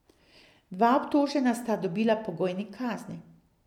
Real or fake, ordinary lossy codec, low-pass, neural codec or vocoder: real; none; 19.8 kHz; none